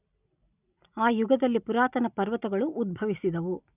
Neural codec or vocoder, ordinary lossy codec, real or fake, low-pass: none; none; real; 3.6 kHz